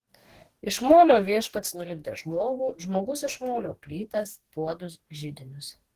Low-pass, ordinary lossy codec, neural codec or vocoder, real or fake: 14.4 kHz; Opus, 16 kbps; codec, 44.1 kHz, 2.6 kbps, DAC; fake